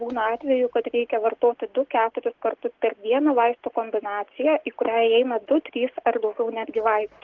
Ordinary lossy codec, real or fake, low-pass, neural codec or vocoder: Opus, 16 kbps; real; 7.2 kHz; none